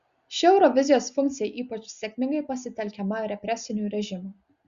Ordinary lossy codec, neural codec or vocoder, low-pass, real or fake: Opus, 64 kbps; none; 7.2 kHz; real